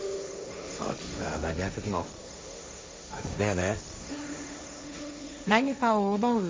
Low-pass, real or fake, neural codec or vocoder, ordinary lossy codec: none; fake; codec, 16 kHz, 1.1 kbps, Voila-Tokenizer; none